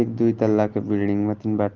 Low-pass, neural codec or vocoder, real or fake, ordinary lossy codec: 7.2 kHz; none; real; Opus, 16 kbps